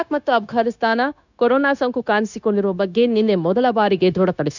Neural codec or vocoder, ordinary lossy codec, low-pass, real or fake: codec, 16 kHz, 0.9 kbps, LongCat-Audio-Codec; none; 7.2 kHz; fake